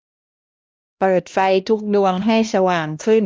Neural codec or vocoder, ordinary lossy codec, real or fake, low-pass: codec, 16 kHz, 1 kbps, X-Codec, HuBERT features, trained on LibriSpeech; none; fake; none